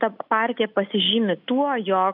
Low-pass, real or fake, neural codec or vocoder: 5.4 kHz; real; none